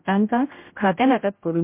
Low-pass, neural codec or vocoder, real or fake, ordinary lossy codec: 3.6 kHz; codec, 16 kHz, 0.5 kbps, X-Codec, HuBERT features, trained on general audio; fake; MP3, 24 kbps